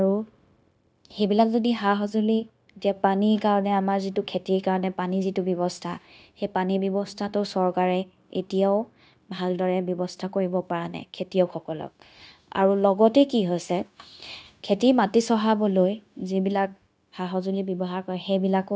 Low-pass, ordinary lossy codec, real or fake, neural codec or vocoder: none; none; fake; codec, 16 kHz, 0.9 kbps, LongCat-Audio-Codec